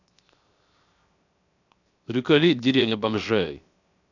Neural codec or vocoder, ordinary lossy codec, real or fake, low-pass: codec, 16 kHz, 0.7 kbps, FocalCodec; none; fake; 7.2 kHz